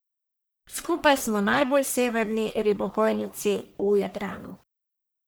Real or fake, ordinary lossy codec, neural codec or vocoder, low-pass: fake; none; codec, 44.1 kHz, 1.7 kbps, Pupu-Codec; none